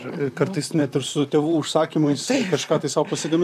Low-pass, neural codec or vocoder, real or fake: 14.4 kHz; vocoder, 44.1 kHz, 128 mel bands, Pupu-Vocoder; fake